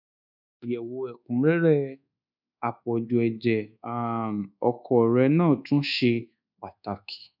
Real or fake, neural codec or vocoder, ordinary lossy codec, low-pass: fake; codec, 24 kHz, 1.2 kbps, DualCodec; none; 5.4 kHz